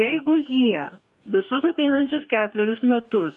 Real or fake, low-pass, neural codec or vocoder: fake; 10.8 kHz; codec, 44.1 kHz, 2.6 kbps, DAC